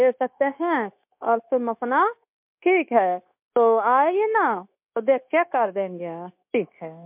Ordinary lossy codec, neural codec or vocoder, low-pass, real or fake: MP3, 32 kbps; codec, 16 kHz, 0.9 kbps, LongCat-Audio-Codec; 3.6 kHz; fake